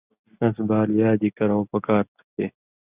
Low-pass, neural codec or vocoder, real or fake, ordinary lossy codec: 3.6 kHz; none; real; Opus, 64 kbps